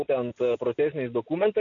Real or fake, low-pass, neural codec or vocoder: real; 7.2 kHz; none